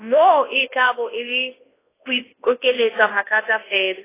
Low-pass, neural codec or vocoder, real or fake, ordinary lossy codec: 3.6 kHz; codec, 24 kHz, 0.9 kbps, WavTokenizer, large speech release; fake; AAC, 16 kbps